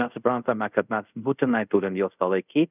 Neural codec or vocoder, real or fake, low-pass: codec, 24 kHz, 0.5 kbps, DualCodec; fake; 3.6 kHz